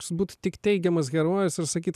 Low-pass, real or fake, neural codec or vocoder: 14.4 kHz; real; none